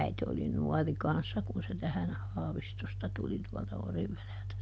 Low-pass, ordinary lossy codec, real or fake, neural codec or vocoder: none; none; real; none